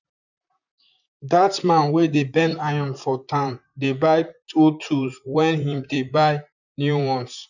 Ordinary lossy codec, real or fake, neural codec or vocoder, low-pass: none; fake; vocoder, 44.1 kHz, 128 mel bands, Pupu-Vocoder; 7.2 kHz